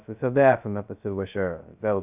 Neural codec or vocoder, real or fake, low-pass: codec, 16 kHz, 0.2 kbps, FocalCodec; fake; 3.6 kHz